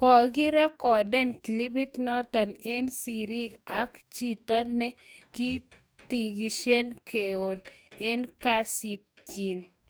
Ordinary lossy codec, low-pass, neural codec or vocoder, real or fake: none; none; codec, 44.1 kHz, 2.6 kbps, DAC; fake